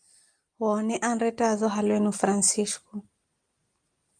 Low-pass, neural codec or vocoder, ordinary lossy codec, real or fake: 9.9 kHz; none; Opus, 32 kbps; real